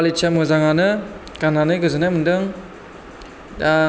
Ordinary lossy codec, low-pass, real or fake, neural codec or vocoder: none; none; real; none